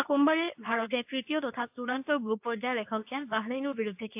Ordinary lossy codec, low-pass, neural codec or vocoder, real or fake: AAC, 32 kbps; 3.6 kHz; codec, 24 kHz, 0.9 kbps, WavTokenizer, medium speech release version 2; fake